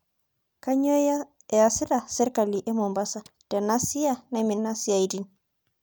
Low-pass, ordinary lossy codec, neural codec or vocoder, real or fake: none; none; none; real